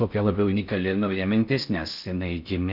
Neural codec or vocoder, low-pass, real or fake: codec, 16 kHz in and 24 kHz out, 0.6 kbps, FocalCodec, streaming, 4096 codes; 5.4 kHz; fake